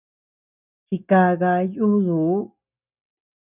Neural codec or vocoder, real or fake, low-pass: none; real; 3.6 kHz